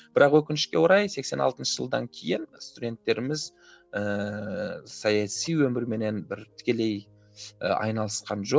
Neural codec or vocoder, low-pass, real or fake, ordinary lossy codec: none; none; real; none